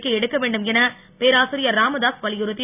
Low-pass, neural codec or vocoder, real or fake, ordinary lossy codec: 3.6 kHz; none; real; none